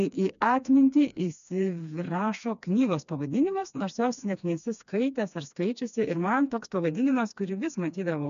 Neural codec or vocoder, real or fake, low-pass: codec, 16 kHz, 2 kbps, FreqCodec, smaller model; fake; 7.2 kHz